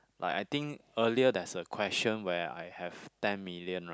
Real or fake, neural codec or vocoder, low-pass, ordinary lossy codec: real; none; none; none